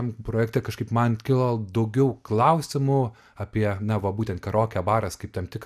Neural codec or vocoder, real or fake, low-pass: none; real; 14.4 kHz